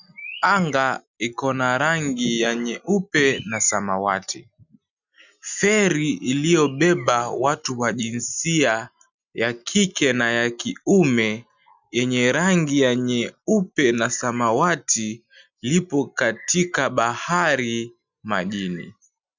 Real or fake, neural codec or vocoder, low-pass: real; none; 7.2 kHz